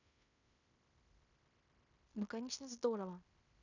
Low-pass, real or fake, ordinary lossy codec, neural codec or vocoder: 7.2 kHz; fake; none; codec, 16 kHz in and 24 kHz out, 0.9 kbps, LongCat-Audio-Codec, fine tuned four codebook decoder